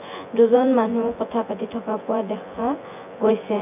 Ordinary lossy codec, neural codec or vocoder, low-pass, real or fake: AAC, 32 kbps; vocoder, 24 kHz, 100 mel bands, Vocos; 3.6 kHz; fake